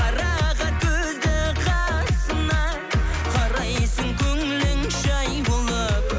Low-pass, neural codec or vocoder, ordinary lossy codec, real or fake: none; none; none; real